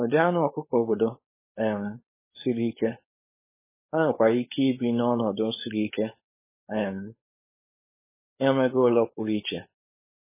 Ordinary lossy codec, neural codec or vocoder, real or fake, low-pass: MP3, 16 kbps; codec, 16 kHz, 4.8 kbps, FACodec; fake; 3.6 kHz